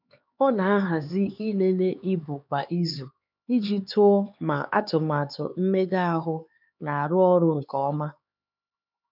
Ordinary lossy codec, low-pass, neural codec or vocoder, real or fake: none; 5.4 kHz; codec, 16 kHz, 4 kbps, X-Codec, HuBERT features, trained on LibriSpeech; fake